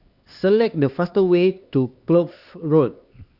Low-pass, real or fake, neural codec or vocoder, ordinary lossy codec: 5.4 kHz; fake; codec, 16 kHz, 2 kbps, X-Codec, HuBERT features, trained on LibriSpeech; Opus, 64 kbps